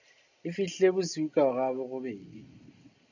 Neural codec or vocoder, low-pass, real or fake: none; 7.2 kHz; real